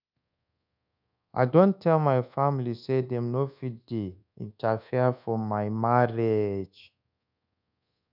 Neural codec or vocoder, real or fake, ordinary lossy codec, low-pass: codec, 24 kHz, 1.2 kbps, DualCodec; fake; none; 5.4 kHz